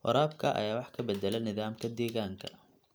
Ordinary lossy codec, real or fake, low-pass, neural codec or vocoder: none; real; none; none